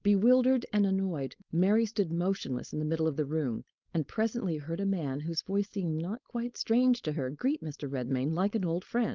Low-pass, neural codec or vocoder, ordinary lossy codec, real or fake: 7.2 kHz; codec, 16 kHz, 4.8 kbps, FACodec; Opus, 24 kbps; fake